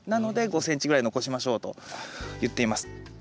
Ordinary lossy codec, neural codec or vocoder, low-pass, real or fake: none; none; none; real